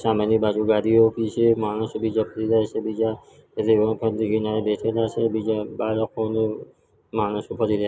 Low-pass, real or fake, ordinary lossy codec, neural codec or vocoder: none; real; none; none